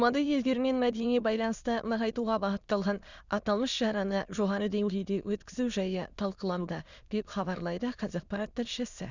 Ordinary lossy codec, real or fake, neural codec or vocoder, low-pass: Opus, 64 kbps; fake; autoencoder, 22.05 kHz, a latent of 192 numbers a frame, VITS, trained on many speakers; 7.2 kHz